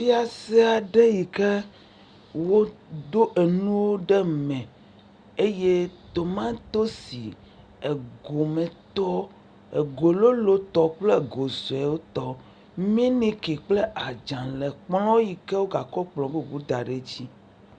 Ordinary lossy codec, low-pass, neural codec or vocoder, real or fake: Opus, 64 kbps; 9.9 kHz; none; real